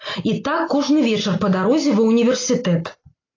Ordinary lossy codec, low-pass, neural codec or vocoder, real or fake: AAC, 32 kbps; 7.2 kHz; none; real